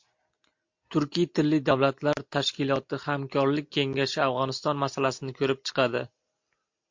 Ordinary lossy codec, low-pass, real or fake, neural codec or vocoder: MP3, 48 kbps; 7.2 kHz; real; none